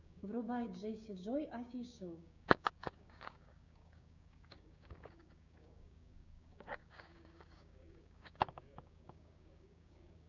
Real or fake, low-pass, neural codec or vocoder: fake; 7.2 kHz; codec, 16 kHz, 6 kbps, DAC